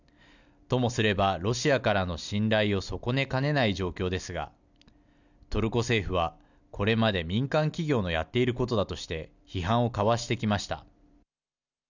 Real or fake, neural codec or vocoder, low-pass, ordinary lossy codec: real; none; 7.2 kHz; none